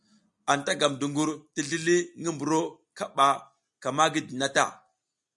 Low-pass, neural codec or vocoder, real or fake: 10.8 kHz; none; real